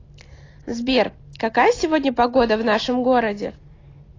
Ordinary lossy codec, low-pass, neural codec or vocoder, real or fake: AAC, 32 kbps; 7.2 kHz; none; real